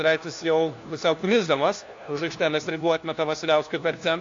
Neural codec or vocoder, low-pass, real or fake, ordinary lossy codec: codec, 16 kHz, 1 kbps, FunCodec, trained on LibriTTS, 50 frames a second; 7.2 kHz; fake; AAC, 48 kbps